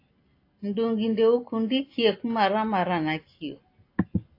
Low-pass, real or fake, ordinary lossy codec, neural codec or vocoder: 5.4 kHz; real; AAC, 32 kbps; none